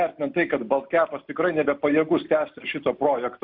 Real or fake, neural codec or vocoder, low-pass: real; none; 5.4 kHz